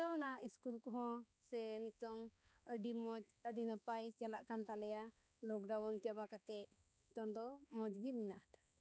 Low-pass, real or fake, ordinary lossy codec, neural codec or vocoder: none; fake; none; codec, 16 kHz, 2 kbps, X-Codec, HuBERT features, trained on balanced general audio